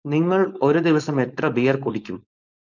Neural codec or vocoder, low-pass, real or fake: codec, 16 kHz, 4.8 kbps, FACodec; 7.2 kHz; fake